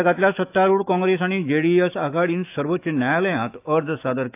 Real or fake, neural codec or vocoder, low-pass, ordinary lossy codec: fake; autoencoder, 48 kHz, 128 numbers a frame, DAC-VAE, trained on Japanese speech; 3.6 kHz; AAC, 32 kbps